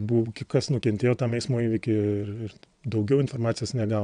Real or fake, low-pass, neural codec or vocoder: fake; 9.9 kHz; vocoder, 22.05 kHz, 80 mel bands, WaveNeXt